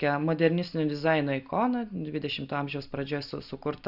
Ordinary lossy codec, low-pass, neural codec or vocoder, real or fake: Opus, 64 kbps; 5.4 kHz; none; real